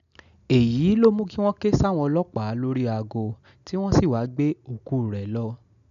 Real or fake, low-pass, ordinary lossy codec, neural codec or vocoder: real; 7.2 kHz; none; none